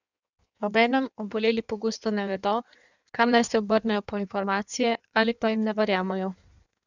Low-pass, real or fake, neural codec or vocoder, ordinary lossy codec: 7.2 kHz; fake; codec, 16 kHz in and 24 kHz out, 1.1 kbps, FireRedTTS-2 codec; none